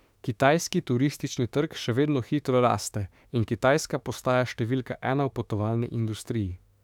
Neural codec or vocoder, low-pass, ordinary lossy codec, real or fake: autoencoder, 48 kHz, 32 numbers a frame, DAC-VAE, trained on Japanese speech; 19.8 kHz; none; fake